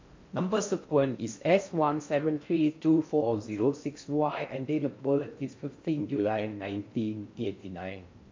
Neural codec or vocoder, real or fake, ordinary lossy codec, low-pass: codec, 16 kHz in and 24 kHz out, 0.6 kbps, FocalCodec, streaming, 4096 codes; fake; MP3, 48 kbps; 7.2 kHz